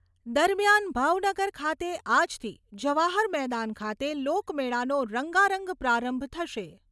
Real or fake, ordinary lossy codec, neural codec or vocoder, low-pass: real; none; none; none